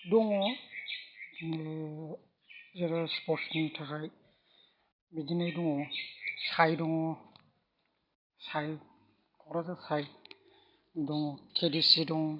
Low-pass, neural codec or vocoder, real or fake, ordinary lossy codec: 5.4 kHz; none; real; none